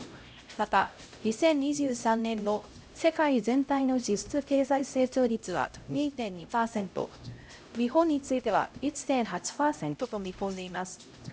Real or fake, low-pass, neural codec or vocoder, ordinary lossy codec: fake; none; codec, 16 kHz, 0.5 kbps, X-Codec, HuBERT features, trained on LibriSpeech; none